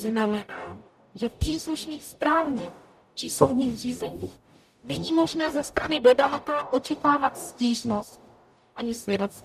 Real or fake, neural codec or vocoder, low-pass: fake; codec, 44.1 kHz, 0.9 kbps, DAC; 14.4 kHz